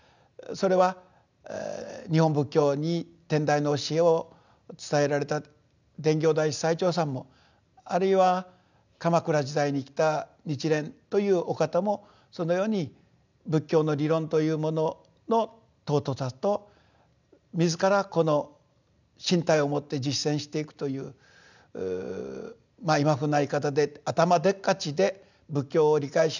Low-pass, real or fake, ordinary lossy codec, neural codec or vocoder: 7.2 kHz; real; none; none